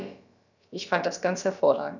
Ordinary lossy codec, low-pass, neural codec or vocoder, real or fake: none; 7.2 kHz; codec, 16 kHz, about 1 kbps, DyCAST, with the encoder's durations; fake